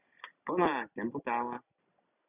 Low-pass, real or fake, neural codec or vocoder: 3.6 kHz; real; none